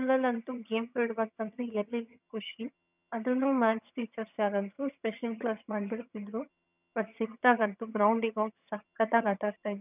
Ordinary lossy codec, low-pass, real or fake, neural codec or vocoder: none; 3.6 kHz; fake; vocoder, 22.05 kHz, 80 mel bands, HiFi-GAN